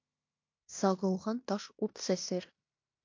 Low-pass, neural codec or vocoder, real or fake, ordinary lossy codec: 7.2 kHz; codec, 16 kHz in and 24 kHz out, 0.9 kbps, LongCat-Audio-Codec, fine tuned four codebook decoder; fake; MP3, 48 kbps